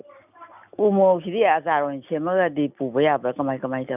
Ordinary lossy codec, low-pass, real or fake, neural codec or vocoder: none; 3.6 kHz; real; none